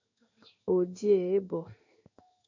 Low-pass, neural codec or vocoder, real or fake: 7.2 kHz; codec, 16 kHz in and 24 kHz out, 1 kbps, XY-Tokenizer; fake